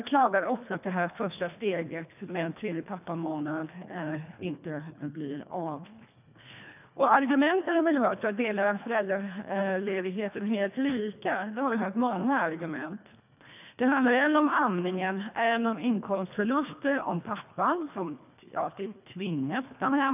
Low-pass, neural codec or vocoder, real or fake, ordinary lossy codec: 3.6 kHz; codec, 24 kHz, 1.5 kbps, HILCodec; fake; none